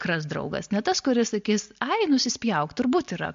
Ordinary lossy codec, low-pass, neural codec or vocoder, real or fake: MP3, 48 kbps; 7.2 kHz; none; real